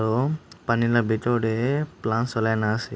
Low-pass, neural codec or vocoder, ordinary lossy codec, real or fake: none; none; none; real